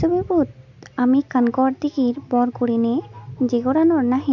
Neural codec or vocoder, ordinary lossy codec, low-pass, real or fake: none; none; 7.2 kHz; real